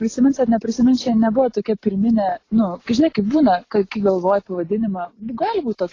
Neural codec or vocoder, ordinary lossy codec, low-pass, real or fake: none; AAC, 32 kbps; 7.2 kHz; real